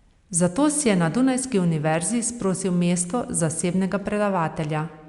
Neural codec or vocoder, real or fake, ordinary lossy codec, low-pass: none; real; Opus, 64 kbps; 10.8 kHz